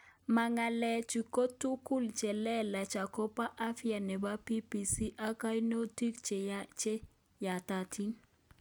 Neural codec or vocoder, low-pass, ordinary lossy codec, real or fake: none; none; none; real